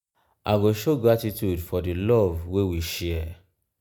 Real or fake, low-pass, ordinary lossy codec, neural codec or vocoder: real; none; none; none